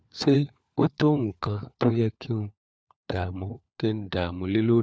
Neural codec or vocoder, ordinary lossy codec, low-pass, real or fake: codec, 16 kHz, 4 kbps, FunCodec, trained on LibriTTS, 50 frames a second; none; none; fake